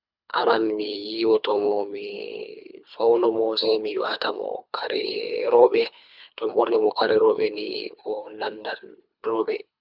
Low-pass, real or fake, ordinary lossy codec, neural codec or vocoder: 5.4 kHz; fake; none; codec, 24 kHz, 3 kbps, HILCodec